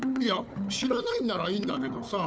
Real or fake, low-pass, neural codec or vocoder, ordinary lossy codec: fake; none; codec, 16 kHz, 4 kbps, FunCodec, trained on Chinese and English, 50 frames a second; none